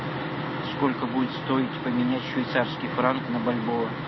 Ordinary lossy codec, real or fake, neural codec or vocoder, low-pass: MP3, 24 kbps; real; none; 7.2 kHz